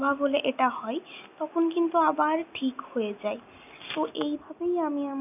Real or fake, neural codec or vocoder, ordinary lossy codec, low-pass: real; none; none; 3.6 kHz